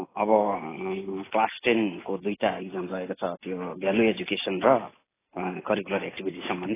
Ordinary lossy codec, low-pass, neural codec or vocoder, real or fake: AAC, 16 kbps; 3.6 kHz; none; real